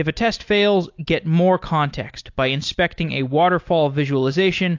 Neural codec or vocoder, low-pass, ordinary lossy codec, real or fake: none; 7.2 kHz; AAC, 48 kbps; real